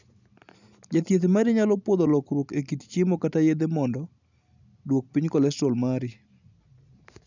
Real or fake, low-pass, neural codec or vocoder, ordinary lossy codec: real; 7.2 kHz; none; none